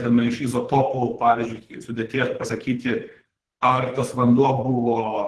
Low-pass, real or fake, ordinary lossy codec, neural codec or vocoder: 10.8 kHz; fake; Opus, 16 kbps; codec, 24 kHz, 3 kbps, HILCodec